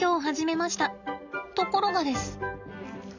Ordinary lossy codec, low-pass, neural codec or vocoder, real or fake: none; 7.2 kHz; none; real